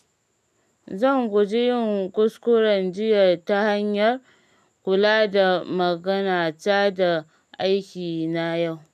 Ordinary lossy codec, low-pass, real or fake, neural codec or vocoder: none; 14.4 kHz; real; none